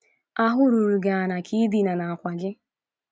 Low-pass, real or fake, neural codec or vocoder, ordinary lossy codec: none; real; none; none